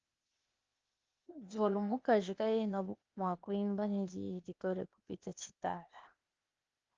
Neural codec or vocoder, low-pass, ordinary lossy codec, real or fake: codec, 16 kHz, 0.8 kbps, ZipCodec; 7.2 kHz; Opus, 24 kbps; fake